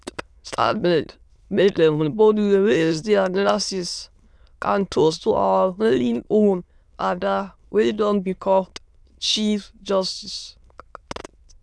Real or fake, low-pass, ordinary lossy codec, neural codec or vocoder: fake; none; none; autoencoder, 22.05 kHz, a latent of 192 numbers a frame, VITS, trained on many speakers